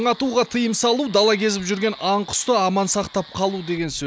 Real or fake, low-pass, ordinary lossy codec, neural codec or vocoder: real; none; none; none